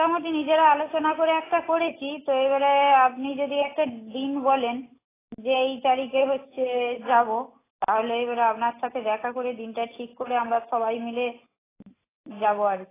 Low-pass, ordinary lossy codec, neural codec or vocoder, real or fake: 3.6 kHz; AAC, 16 kbps; none; real